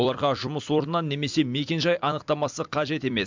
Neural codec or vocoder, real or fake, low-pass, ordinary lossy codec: vocoder, 44.1 kHz, 128 mel bands every 256 samples, BigVGAN v2; fake; 7.2 kHz; MP3, 64 kbps